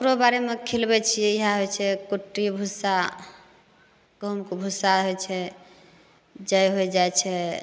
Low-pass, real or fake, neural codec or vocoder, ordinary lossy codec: none; real; none; none